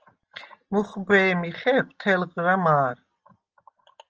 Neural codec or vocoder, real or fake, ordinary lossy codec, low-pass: none; real; Opus, 24 kbps; 7.2 kHz